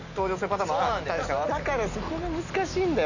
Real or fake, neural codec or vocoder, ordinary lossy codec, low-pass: real; none; none; 7.2 kHz